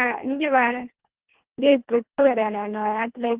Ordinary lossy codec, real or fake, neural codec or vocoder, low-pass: Opus, 16 kbps; fake; codec, 24 kHz, 1.5 kbps, HILCodec; 3.6 kHz